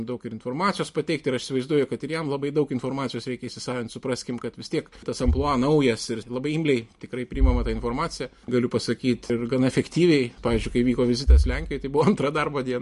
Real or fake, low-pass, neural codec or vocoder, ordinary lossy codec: real; 14.4 kHz; none; MP3, 48 kbps